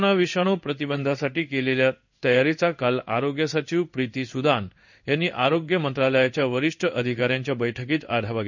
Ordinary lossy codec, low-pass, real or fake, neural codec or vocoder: none; 7.2 kHz; fake; codec, 16 kHz in and 24 kHz out, 1 kbps, XY-Tokenizer